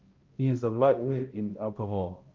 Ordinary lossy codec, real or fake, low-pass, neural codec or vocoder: Opus, 32 kbps; fake; 7.2 kHz; codec, 16 kHz, 0.5 kbps, X-Codec, HuBERT features, trained on balanced general audio